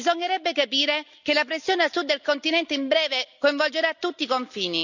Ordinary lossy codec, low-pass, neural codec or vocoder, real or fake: none; 7.2 kHz; none; real